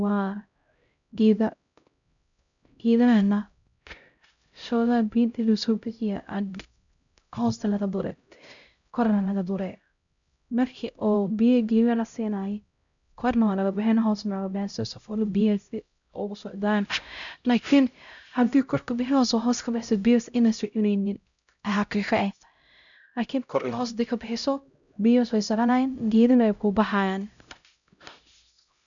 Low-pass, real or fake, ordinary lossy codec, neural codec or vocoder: 7.2 kHz; fake; none; codec, 16 kHz, 0.5 kbps, X-Codec, HuBERT features, trained on LibriSpeech